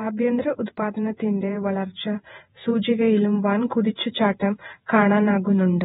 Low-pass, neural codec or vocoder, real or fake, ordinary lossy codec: 19.8 kHz; vocoder, 48 kHz, 128 mel bands, Vocos; fake; AAC, 16 kbps